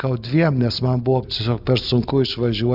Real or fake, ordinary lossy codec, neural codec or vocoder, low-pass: real; Opus, 64 kbps; none; 5.4 kHz